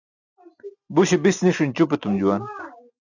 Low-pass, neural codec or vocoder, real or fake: 7.2 kHz; none; real